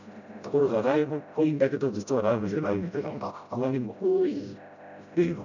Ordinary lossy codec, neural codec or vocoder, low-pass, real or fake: none; codec, 16 kHz, 0.5 kbps, FreqCodec, smaller model; 7.2 kHz; fake